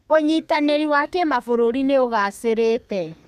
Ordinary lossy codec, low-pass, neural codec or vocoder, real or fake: none; 14.4 kHz; codec, 32 kHz, 1.9 kbps, SNAC; fake